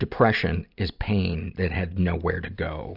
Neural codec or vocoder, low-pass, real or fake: none; 5.4 kHz; real